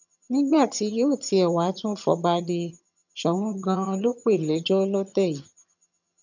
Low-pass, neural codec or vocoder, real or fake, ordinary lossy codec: 7.2 kHz; vocoder, 22.05 kHz, 80 mel bands, HiFi-GAN; fake; none